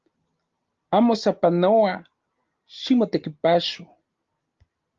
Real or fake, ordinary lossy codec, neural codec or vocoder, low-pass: real; Opus, 32 kbps; none; 7.2 kHz